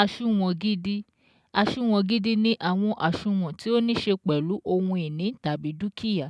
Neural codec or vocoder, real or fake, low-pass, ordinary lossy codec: none; real; none; none